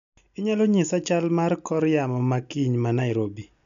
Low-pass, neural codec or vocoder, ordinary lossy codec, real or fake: 7.2 kHz; none; none; real